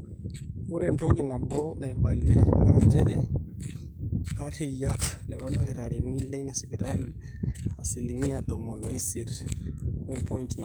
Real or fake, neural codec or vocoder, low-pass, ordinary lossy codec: fake; codec, 44.1 kHz, 2.6 kbps, SNAC; none; none